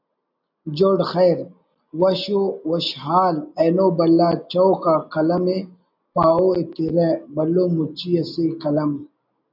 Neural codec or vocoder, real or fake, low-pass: none; real; 5.4 kHz